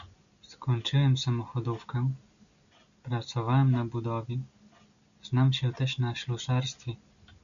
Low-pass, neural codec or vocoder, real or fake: 7.2 kHz; none; real